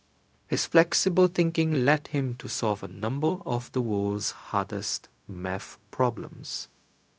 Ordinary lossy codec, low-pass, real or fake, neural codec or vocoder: none; none; fake; codec, 16 kHz, 0.4 kbps, LongCat-Audio-Codec